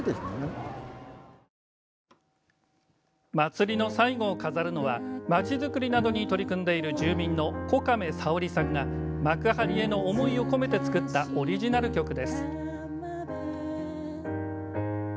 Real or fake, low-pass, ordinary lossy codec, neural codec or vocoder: real; none; none; none